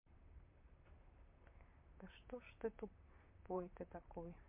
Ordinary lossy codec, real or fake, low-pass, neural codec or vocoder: MP3, 32 kbps; fake; 3.6 kHz; vocoder, 44.1 kHz, 128 mel bands, Pupu-Vocoder